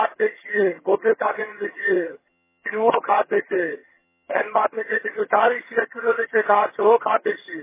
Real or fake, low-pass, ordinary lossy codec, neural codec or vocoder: fake; 3.6 kHz; MP3, 16 kbps; vocoder, 22.05 kHz, 80 mel bands, HiFi-GAN